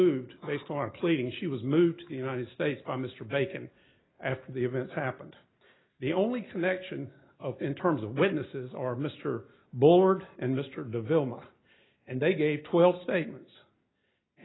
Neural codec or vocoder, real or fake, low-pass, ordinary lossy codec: none; real; 7.2 kHz; AAC, 16 kbps